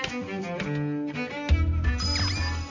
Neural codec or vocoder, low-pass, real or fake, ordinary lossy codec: none; 7.2 kHz; real; MP3, 64 kbps